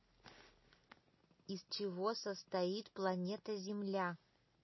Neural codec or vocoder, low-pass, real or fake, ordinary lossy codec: none; 7.2 kHz; real; MP3, 24 kbps